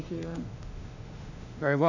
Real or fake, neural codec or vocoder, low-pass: fake; autoencoder, 48 kHz, 32 numbers a frame, DAC-VAE, trained on Japanese speech; 7.2 kHz